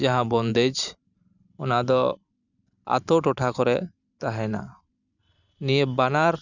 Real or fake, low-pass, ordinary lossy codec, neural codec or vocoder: fake; 7.2 kHz; none; vocoder, 44.1 kHz, 128 mel bands every 512 samples, BigVGAN v2